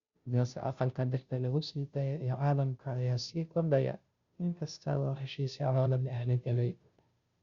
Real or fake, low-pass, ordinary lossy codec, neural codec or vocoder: fake; 7.2 kHz; Opus, 64 kbps; codec, 16 kHz, 0.5 kbps, FunCodec, trained on Chinese and English, 25 frames a second